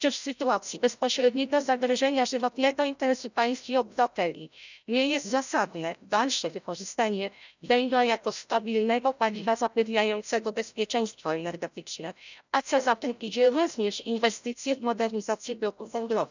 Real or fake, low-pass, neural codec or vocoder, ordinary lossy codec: fake; 7.2 kHz; codec, 16 kHz, 0.5 kbps, FreqCodec, larger model; none